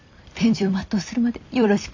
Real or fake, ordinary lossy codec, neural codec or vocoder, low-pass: fake; MP3, 64 kbps; vocoder, 44.1 kHz, 128 mel bands every 256 samples, BigVGAN v2; 7.2 kHz